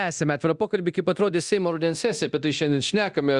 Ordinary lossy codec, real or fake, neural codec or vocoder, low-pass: Opus, 64 kbps; fake; codec, 24 kHz, 0.9 kbps, DualCodec; 10.8 kHz